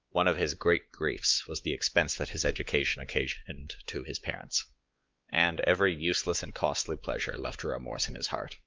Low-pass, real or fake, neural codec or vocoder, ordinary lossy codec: 7.2 kHz; fake; codec, 16 kHz, 4 kbps, X-Codec, WavLM features, trained on Multilingual LibriSpeech; Opus, 32 kbps